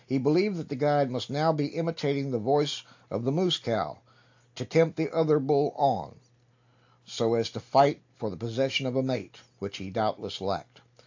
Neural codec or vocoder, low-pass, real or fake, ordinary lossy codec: none; 7.2 kHz; real; AAC, 48 kbps